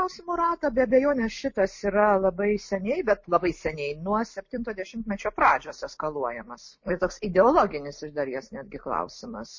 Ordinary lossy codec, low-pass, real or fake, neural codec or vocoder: MP3, 32 kbps; 7.2 kHz; real; none